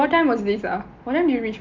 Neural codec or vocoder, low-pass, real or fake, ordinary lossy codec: none; 7.2 kHz; real; Opus, 24 kbps